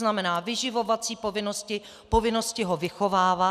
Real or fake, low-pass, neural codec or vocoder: real; 14.4 kHz; none